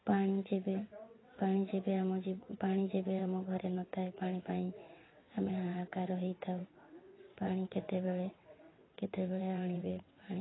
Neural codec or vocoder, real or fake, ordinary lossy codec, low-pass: vocoder, 22.05 kHz, 80 mel bands, WaveNeXt; fake; AAC, 16 kbps; 7.2 kHz